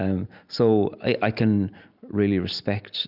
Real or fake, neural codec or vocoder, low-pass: real; none; 5.4 kHz